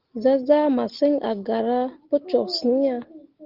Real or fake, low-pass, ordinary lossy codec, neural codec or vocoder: real; 5.4 kHz; Opus, 16 kbps; none